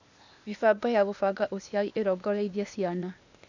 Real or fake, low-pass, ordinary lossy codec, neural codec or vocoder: fake; 7.2 kHz; none; codec, 16 kHz, 0.8 kbps, ZipCodec